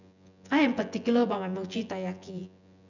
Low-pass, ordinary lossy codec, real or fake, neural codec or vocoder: 7.2 kHz; none; fake; vocoder, 24 kHz, 100 mel bands, Vocos